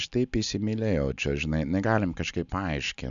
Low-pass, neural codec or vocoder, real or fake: 7.2 kHz; none; real